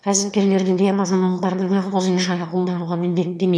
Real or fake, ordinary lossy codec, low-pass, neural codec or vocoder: fake; none; none; autoencoder, 22.05 kHz, a latent of 192 numbers a frame, VITS, trained on one speaker